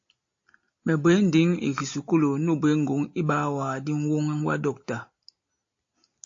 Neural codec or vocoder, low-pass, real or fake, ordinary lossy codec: none; 7.2 kHz; real; AAC, 48 kbps